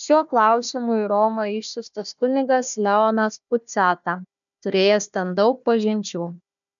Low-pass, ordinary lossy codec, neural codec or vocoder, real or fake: 7.2 kHz; MP3, 96 kbps; codec, 16 kHz, 1 kbps, FunCodec, trained on Chinese and English, 50 frames a second; fake